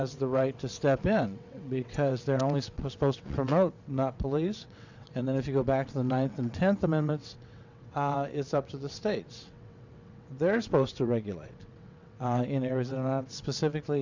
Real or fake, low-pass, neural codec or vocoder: fake; 7.2 kHz; vocoder, 22.05 kHz, 80 mel bands, WaveNeXt